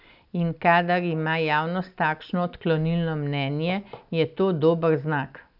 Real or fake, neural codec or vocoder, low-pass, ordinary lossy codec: real; none; 5.4 kHz; none